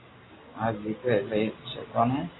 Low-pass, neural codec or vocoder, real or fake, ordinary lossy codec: 7.2 kHz; none; real; AAC, 16 kbps